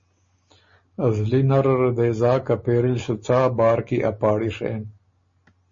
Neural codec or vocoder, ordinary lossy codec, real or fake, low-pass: none; MP3, 32 kbps; real; 7.2 kHz